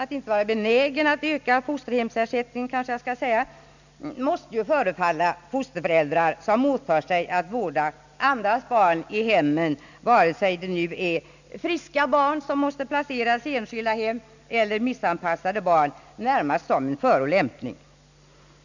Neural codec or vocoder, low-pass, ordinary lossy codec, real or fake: none; 7.2 kHz; none; real